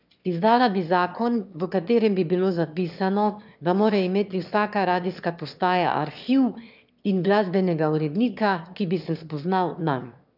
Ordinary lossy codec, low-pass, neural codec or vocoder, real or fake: none; 5.4 kHz; autoencoder, 22.05 kHz, a latent of 192 numbers a frame, VITS, trained on one speaker; fake